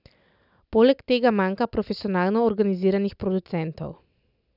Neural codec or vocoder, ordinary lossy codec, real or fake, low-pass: none; none; real; 5.4 kHz